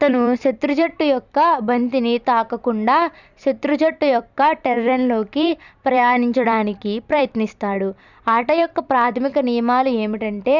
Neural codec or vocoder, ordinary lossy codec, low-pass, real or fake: vocoder, 44.1 kHz, 128 mel bands every 256 samples, BigVGAN v2; none; 7.2 kHz; fake